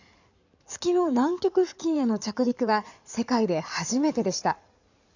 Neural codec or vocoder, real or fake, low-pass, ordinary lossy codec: codec, 16 kHz in and 24 kHz out, 2.2 kbps, FireRedTTS-2 codec; fake; 7.2 kHz; none